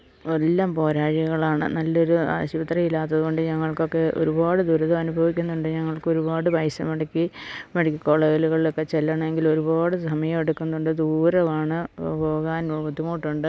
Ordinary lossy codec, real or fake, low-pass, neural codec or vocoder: none; real; none; none